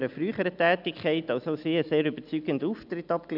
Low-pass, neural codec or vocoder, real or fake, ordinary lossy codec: 5.4 kHz; none; real; none